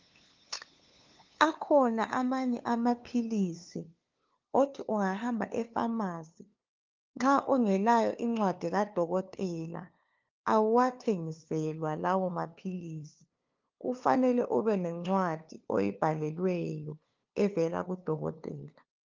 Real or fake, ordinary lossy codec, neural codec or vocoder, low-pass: fake; Opus, 32 kbps; codec, 16 kHz, 2 kbps, FunCodec, trained on LibriTTS, 25 frames a second; 7.2 kHz